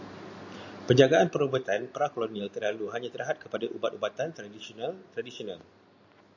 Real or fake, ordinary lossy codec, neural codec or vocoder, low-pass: real; AAC, 48 kbps; none; 7.2 kHz